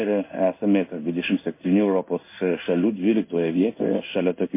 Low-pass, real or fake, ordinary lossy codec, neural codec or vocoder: 3.6 kHz; fake; MP3, 24 kbps; codec, 16 kHz in and 24 kHz out, 1 kbps, XY-Tokenizer